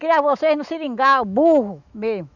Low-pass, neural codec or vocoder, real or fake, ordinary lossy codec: 7.2 kHz; autoencoder, 48 kHz, 128 numbers a frame, DAC-VAE, trained on Japanese speech; fake; none